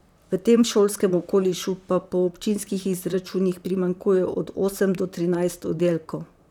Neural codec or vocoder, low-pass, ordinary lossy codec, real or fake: vocoder, 44.1 kHz, 128 mel bands, Pupu-Vocoder; 19.8 kHz; none; fake